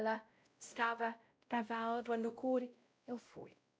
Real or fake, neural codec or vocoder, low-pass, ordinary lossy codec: fake; codec, 16 kHz, 0.5 kbps, X-Codec, WavLM features, trained on Multilingual LibriSpeech; none; none